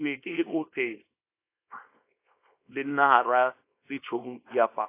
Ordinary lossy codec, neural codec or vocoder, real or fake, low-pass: AAC, 24 kbps; codec, 24 kHz, 0.9 kbps, WavTokenizer, small release; fake; 3.6 kHz